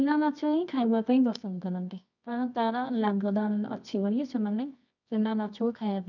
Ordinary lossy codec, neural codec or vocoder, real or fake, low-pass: none; codec, 24 kHz, 0.9 kbps, WavTokenizer, medium music audio release; fake; 7.2 kHz